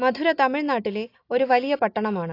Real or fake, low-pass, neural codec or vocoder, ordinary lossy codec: real; 5.4 kHz; none; AAC, 32 kbps